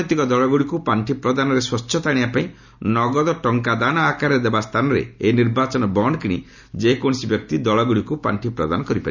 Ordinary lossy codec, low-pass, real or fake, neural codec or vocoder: none; 7.2 kHz; real; none